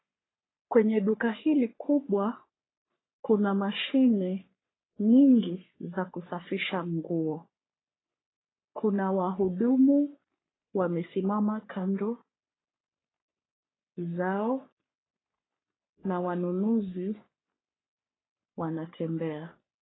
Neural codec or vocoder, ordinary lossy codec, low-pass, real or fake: codec, 44.1 kHz, 3.4 kbps, Pupu-Codec; AAC, 16 kbps; 7.2 kHz; fake